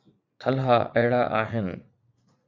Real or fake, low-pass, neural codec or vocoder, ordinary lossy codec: fake; 7.2 kHz; vocoder, 44.1 kHz, 80 mel bands, Vocos; MP3, 64 kbps